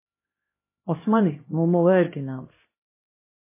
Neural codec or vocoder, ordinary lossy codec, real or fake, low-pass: codec, 16 kHz, 1 kbps, X-Codec, HuBERT features, trained on LibriSpeech; MP3, 16 kbps; fake; 3.6 kHz